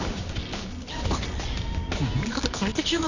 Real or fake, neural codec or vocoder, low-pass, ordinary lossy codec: fake; codec, 24 kHz, 0.9 kbps, WavTokenizer, medium music audio release; 7.2 kHz; none